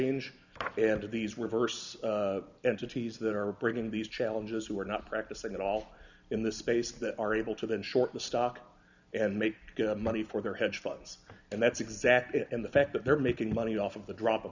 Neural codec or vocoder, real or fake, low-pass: none; real; 7.2 kHz